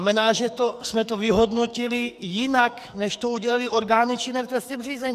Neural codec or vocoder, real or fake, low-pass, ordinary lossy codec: codec, 44.1 kHz, 2.6 kbps, SNAC; fake; 14.4 kHz; MP3, 96 kbps